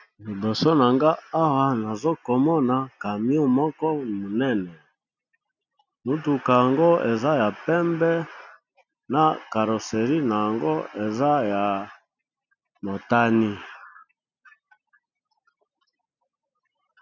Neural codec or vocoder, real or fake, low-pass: none; real; 7.2 kHz